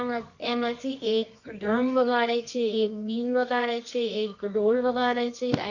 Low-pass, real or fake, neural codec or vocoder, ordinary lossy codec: 7.2 kHz; fake; codec, 24 kHz, 0.9 kbps, WavTokenizer, medium music audio release; none